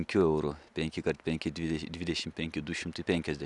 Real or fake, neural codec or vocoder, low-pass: real; none; 10.8 kHz